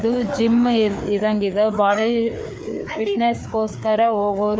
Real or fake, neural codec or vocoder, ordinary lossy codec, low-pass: fake; codec, 16 kHz, 4 kbps, FreqCodec, larger model; none; none